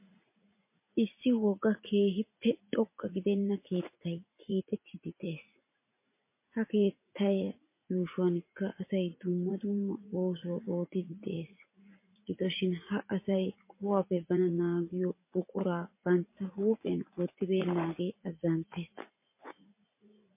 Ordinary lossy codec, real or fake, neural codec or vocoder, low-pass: MP3, 24 kbps; fake; vocoder, 44.1 kHz, 80 mel bands, Vocos; 3.6 kHz